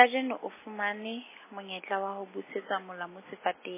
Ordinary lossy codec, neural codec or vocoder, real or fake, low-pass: MP3, 16 kbps; none; real; 3.6 kHz